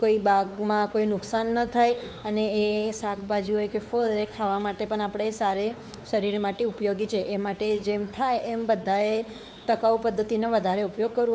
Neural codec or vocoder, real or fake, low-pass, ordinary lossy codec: codec, 16 kHz, 4 kbps, X-Codec, WavLM features, trained on Multilingual LibriSpeech; fake; none; none